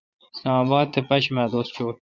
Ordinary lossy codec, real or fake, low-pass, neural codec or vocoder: Opus, 64 kbps; fake; 7.2 kHz; vocoder, 22.05 kHz, 80 mel bands, Vocos